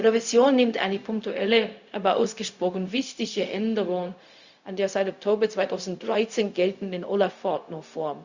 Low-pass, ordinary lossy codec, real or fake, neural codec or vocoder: 7.2 kHz; Opus, 64 kbps; fake; codec, 16 kHz, 0.4 kbps, LongCat-Audio-Codec